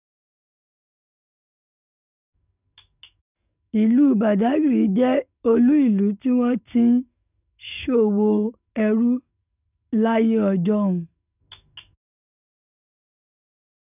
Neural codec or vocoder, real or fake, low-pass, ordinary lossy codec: codec, 44.1 kHz, 7.8 kbps, DAC; fake; 3.6 kHz; none